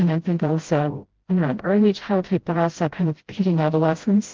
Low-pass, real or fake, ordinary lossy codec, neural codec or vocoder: 7.2 kHz; fake; Opus, 16 kbps; codec, 16 kHz, 0.5 kbps, FreqCodec, smaller model